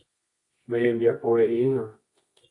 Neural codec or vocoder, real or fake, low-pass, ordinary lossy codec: codec, 24 kHz, 0.9 kbps, WavTokenizer, medium music audio release; fake; 10.8 kHz; AAC, 32 kbps